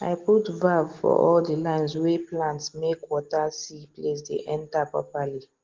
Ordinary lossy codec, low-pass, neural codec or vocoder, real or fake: Opus, 16 kbps; 7.2 kHz; none; real